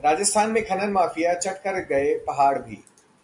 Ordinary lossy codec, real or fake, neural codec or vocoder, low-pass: MP3, 64 kbps; real; none; 10.8 kHz